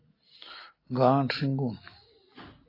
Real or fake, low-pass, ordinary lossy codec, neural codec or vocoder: real; 5.4 kHz; AAC, 32 kbps; none